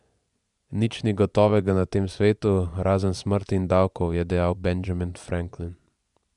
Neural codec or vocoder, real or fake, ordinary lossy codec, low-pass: vocoder, 24 kHz, 100 mel bands, Vocos; fake; none; 10.8 kHz